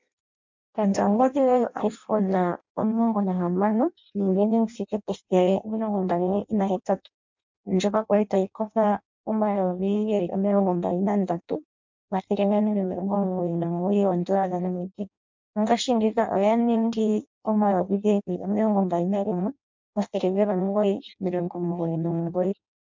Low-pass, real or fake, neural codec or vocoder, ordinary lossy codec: 7.2 kHz; fake; codec, 16 kHz in and 24 kHz out, 0.6 kbps, FireRedTTS-2 codec; MP3, 64 kbps